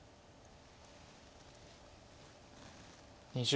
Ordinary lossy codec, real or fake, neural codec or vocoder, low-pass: none; real; none; none